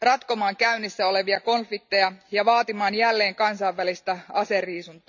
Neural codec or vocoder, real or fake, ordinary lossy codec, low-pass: none; real; none; 7.2 kHz